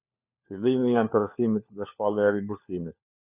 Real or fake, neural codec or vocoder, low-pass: fake; codec, 16 kHz, 4 kbps, FunCodec, trained on LibriTTS, 50 frames a second; 3.6 kHz